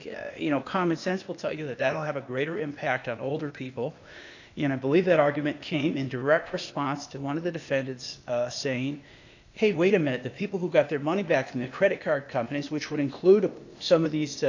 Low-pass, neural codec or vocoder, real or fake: 7.2 kHz; codec, 16 kHz, 0.8 kbps, ZipCodec; fake